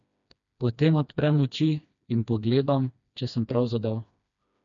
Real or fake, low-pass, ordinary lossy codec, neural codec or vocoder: fake; 7.2 kHz; none; codec, 16 kHz, 2 kbps, FreqCodec, smaller model